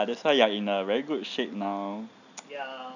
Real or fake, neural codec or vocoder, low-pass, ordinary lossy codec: real; none; 7.2 kHz; none